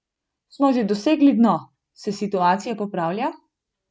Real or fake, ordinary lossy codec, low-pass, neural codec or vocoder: real; none; none; none